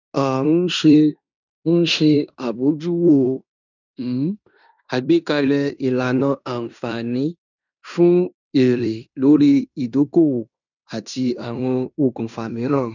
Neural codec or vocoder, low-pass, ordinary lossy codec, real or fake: codec, 16 kHz in and 24 kHz out, 0.9 kbps, LongCat-Audio-Codec, fine tuned four codebook decoder; 7.2 kHz; none; fake